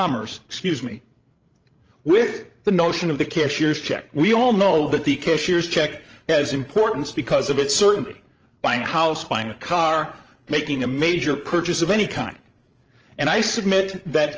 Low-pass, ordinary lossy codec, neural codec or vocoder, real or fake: 7.2 kHz; Opus, 24 kbps; codec, 16 kHz, 16 kbps, FreqCodec, larger model; fake